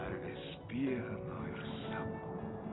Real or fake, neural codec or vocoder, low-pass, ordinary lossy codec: real; none; 7.2 kHz; AAC, 16 kbps